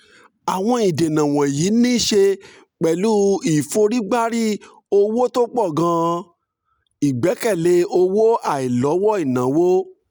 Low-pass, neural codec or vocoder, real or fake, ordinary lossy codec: none; none; real; none